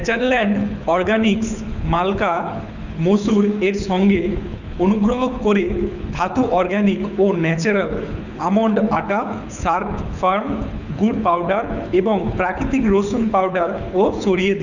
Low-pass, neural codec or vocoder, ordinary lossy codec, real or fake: 7.2 kHz; codec, 24 kHz, 6 kbps, HILCodec; none; fake